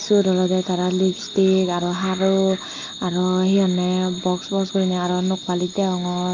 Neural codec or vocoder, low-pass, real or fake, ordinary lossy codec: none; 7.2 kHz; real; Opus, 32 kbps